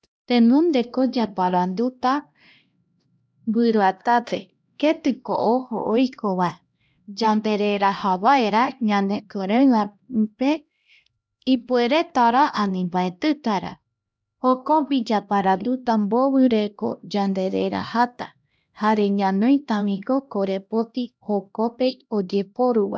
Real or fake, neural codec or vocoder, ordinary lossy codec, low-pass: fake; codec, 16 kHz, 1 kbps, X-Codec, HuBERT features, trained on LibriSpeech; none; none